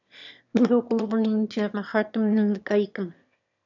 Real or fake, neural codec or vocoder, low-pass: fake; autoencoder, 22.05 kHz, a latent of 192 numbers a frame, VITS, trained on one speaker; 7.2 kHz